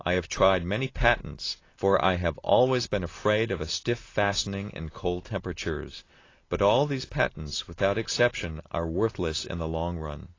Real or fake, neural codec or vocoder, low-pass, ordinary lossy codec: real; none; 7.2 kHz; AAC, 32 kbps